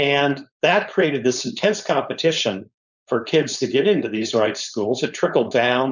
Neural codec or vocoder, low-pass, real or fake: codec, 16 kHz, 4.8 kbps, FACodec; 7.2 kHz; fake